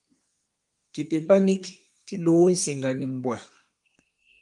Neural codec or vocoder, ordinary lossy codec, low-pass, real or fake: codec, 24 kHz, 1 kbps, SNAC; Opus, 32 kbps; 10.8 kHz; fake